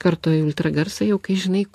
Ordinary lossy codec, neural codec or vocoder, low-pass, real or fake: AAC, 64 kbps; none; 14.4 kHz; real